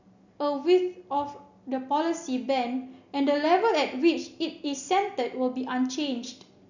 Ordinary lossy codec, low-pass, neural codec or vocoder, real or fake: none; 7.2 kHz; none; real